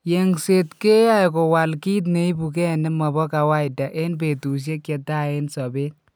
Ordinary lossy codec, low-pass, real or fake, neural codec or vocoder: none; none; real; none